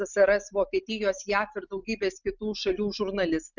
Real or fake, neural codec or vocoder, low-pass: real; none; 7.2 kHz